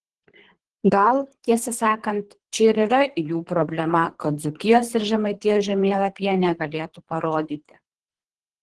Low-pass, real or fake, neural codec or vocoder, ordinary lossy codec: 10.8 kHz; fake; codec, 24 kHz, 3 kbps, HILCodec; Opus, 16 kbps